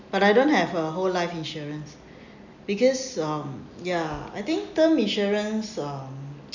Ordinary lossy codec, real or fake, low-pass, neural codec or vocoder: none; real; 7.2 kHz; none